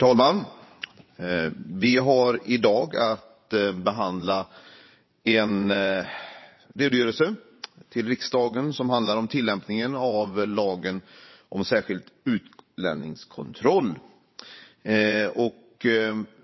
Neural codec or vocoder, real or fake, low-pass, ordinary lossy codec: vocoder, 22.05 kHz, 80 mel bands, WaveNeXt; fake; 7.2 kHz; MP3, 24 kbps